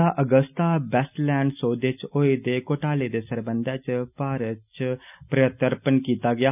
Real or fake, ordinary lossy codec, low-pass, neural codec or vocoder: real; none; 3.6 kHz; none